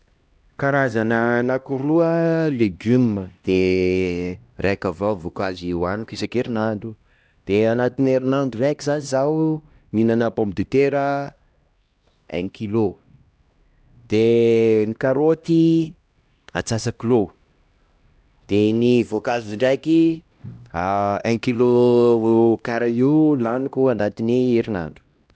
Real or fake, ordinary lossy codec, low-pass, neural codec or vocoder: fake; none; none; codec, 16 kHz, 1 kbps, X-Codec, HuBERT features, trained on LibriSpeech